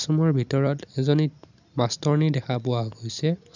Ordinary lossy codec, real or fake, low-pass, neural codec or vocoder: none; real; 7.2 kHz; none